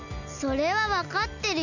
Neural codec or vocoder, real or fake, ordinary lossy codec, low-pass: none; real; none; 7.2 kHz